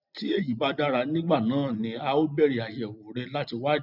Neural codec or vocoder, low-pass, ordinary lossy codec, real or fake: none; 5.4 kHz; none; real